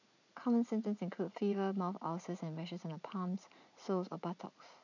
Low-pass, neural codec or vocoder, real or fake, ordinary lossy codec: 7.2 kHz; vocoder, 44.1 kHz, 80 mel bands, Vocos; fake; none